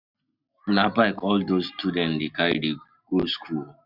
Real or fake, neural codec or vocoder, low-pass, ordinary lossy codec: real; none; 5.4 kHz; none